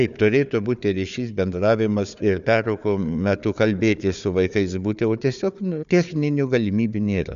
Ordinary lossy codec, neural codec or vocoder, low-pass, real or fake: MP3, 96 kbps; codec, 16 kHz, 4 kbps, FunCodec, trained on Chinese and English, 50 frames a second; 7.2 kHz; fake